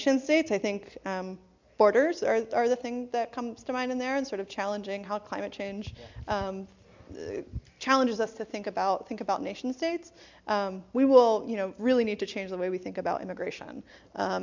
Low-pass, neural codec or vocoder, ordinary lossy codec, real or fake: 7.2 kHz; none; MP3, 64 kbps; real